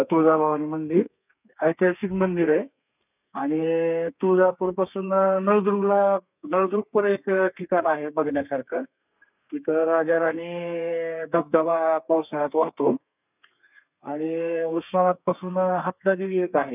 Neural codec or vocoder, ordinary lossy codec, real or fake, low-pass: codec, 32 kHz, 1.9 kbps, SNAC; none; fake; 3.6 kHz